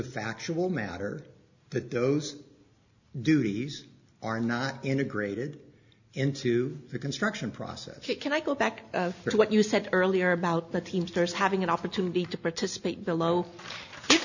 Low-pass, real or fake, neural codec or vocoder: 7.2 kHz; real; none